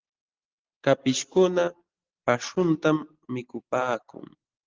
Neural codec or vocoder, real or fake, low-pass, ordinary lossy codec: none; real; 7.2 kHz; Opus, 16 kbps